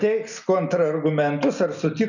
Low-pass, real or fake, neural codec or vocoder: 7.2 kHz; real; none